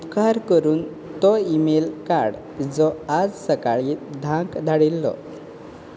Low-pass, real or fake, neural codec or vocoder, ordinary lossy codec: none; real; none; none